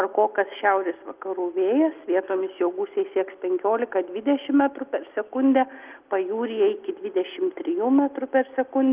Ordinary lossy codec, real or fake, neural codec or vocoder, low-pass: Opus, 32 kbps; real; none; 3.6 kHz